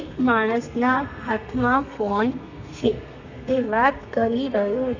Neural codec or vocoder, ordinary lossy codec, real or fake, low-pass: codec, 32 kHz, 1.9 kbps, SNAC; none; fake; 7.2 kHz